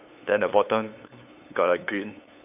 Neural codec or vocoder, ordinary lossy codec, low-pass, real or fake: codec, 16 kHz, 8 kbps, FunCodec, trained on LibriTTS, 25 frames a second; AAC, 32 kbps; 3.6 kHz; fake